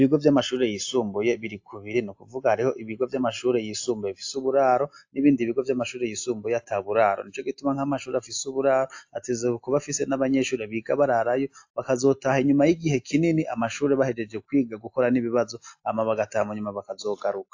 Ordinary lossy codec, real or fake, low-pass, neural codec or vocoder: AAC, 48 kbps; real; 7.2 kHz; none